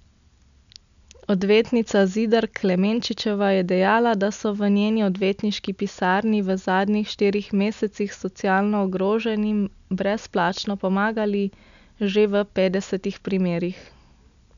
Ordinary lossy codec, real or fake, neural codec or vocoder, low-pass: none; real; none; 7.2 kHz